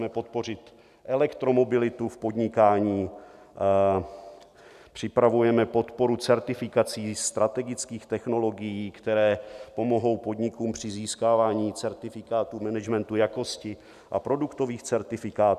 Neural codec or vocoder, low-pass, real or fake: none; 14.4 kHz; real